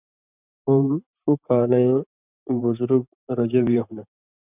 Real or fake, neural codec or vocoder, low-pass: fake; vocoder, 44.1 kHz, 128 mel bands every 512 samples, BigVGAN v2; 3.6 kHz